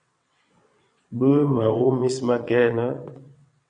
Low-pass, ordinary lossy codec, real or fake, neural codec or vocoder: 9.9 kHz; MP3, 48 kbps; fake; vocoder, 22.05 kHz, 80 mel bands, WaveNeXt